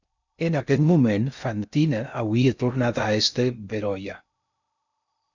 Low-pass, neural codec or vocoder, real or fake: 7.2 kHz; codec, 16 kHz in and 24 kHz out, 0.6 kbps, FocalCodec, streaming, 2048 codes; fake